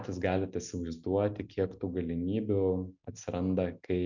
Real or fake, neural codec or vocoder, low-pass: real; none; 7.2 kHz